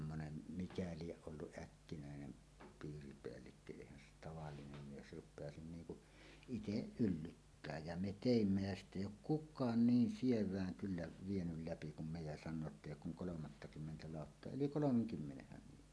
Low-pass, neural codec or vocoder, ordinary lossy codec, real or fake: none; none; none; real